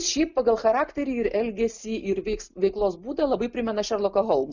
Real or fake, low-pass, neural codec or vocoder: real; 7.2 kHz; none